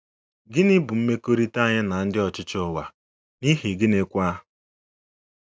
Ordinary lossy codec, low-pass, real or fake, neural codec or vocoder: none; none; real; none